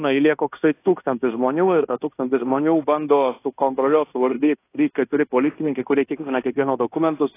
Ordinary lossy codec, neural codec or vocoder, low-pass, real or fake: AAC, 24 kbps; codec, 16 kHz in and 24 kHz out, 0.9 kbps, LongCat-Audio-Codec, fine tuned four codebook decoder; 3.6 kHz; fake